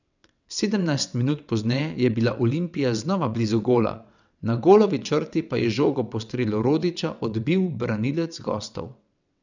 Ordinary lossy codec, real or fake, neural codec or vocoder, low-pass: none; fake; vocoder, 22.05 kHz, 80 mel bands, WaveNeXt; 7.2 kHz